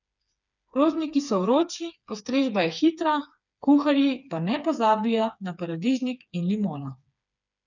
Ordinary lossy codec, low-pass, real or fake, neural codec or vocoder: none; 7.2 kHz; fake; codec, 16 kHz, 4 kbps, FreqCodec, smaller model